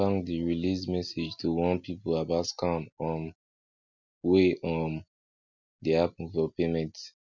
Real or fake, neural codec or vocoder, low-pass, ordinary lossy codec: real; none; 7.2 kHz; none